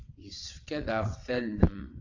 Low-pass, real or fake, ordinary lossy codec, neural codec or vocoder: 7.2 kHz; fake; AAC, 48 kbps; vocoder, 22.05 kHz, 80 mel bands, WaveNeXt